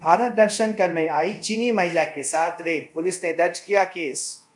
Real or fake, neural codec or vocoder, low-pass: fake; codec, 24 kHz, 0.5 kbps, DualCodec; 10.8 kHz